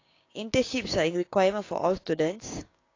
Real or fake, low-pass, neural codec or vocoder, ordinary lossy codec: fake; 7.2 kHz; codec, 16 kHz, 6 kbps, DAC; AAC, 32 kbps